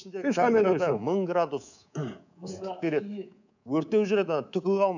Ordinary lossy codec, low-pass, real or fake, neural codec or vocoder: none; 7.2 kHz; fake; codec, 24 kHz, 3.1 kbps, DualCodec